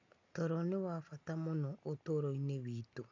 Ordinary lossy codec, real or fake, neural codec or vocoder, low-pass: AAC, 48 kbps; real; none; 7.2 kHz